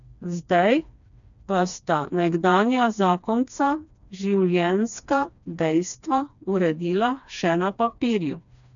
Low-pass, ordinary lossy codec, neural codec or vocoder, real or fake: 7.2 kHz; none; codec, 16 kHz, 2 kbps, FreqCodec, smaller model; fake